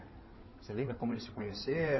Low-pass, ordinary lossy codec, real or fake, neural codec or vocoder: 7.2 kHz; MP3, 24 kbps; fake; codec, 16 kHz in and 24 kHz out, 2.2 kbps, FireRedTTS-2 codec